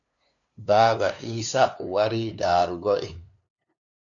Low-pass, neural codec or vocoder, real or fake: 7.2 kHz; codec, 16 kHz, 2 kbps, FunCodec, trained on LibriTTS, 25 frames a second; fake